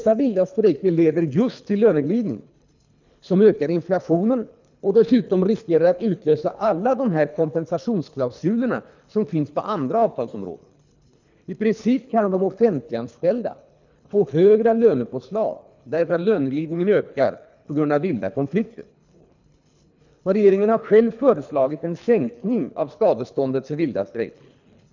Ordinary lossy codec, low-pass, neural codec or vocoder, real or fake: none; 7.2 kHz; codec, 24 kHz, 3 kbps, HILCodec; fake